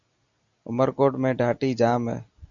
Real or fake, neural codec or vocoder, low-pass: real; none; 7.2 kHz